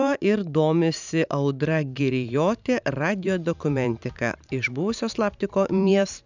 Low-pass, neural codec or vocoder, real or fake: 7.2 kHz; vocoder, 44.1 kHz, 128 mel bands every 512 samples, BigVGAN v2; fake